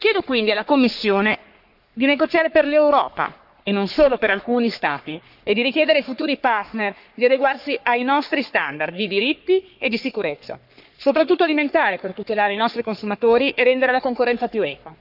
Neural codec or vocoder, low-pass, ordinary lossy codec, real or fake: codec, 44.1 kHz, 3.4 kbps, Pupu-Codec; 5.4 kHz; none; fake